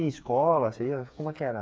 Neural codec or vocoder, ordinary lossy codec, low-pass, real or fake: codec, 16 kHz, 4 kbps, FreqCodec, smaller model; none; none; fake